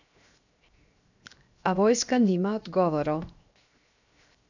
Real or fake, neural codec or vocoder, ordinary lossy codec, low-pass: fake; codec, 16 kHz, 0.7 kbps, FocalCodec; none; 7.2 kHz